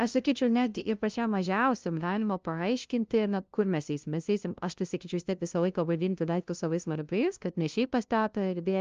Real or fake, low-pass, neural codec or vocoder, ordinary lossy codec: fake; 7.2 kHz; codec, 16 kHz, 0.5 kbps, FunCodec, trained on LibriTTS, 25 frames a second; Opus, 24 kbps